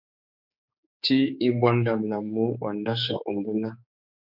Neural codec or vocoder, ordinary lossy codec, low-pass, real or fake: codec, 16 kHz, 4 kbps, X-Codec, HuBERT features, trained on general audio; MP3, 48 kbps; 5.4 kHz; fake